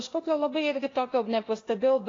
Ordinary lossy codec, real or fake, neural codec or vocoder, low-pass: AAC, 32 kbps; fake; codec, 16 kHz, 0.8 kbps, ZipCodec; 7.2 kHz